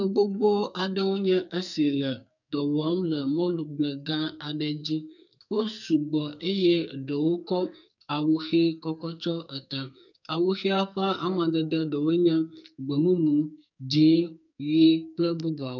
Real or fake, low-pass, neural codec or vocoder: fake; 7.2 kHz; codec, 44.1 kHz, 2.6 kbps, SNAC